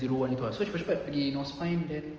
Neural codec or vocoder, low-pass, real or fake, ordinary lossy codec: none; 7.2 kHz; real; Opus, 24 kbps